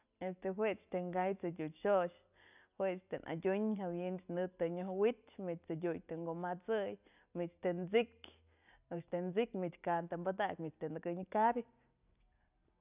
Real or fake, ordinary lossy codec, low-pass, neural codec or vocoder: real; none; 3.6 kHz; none